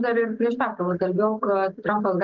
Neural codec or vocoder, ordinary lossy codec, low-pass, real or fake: none; Opus, 32 kbps; 7.2 kHz; real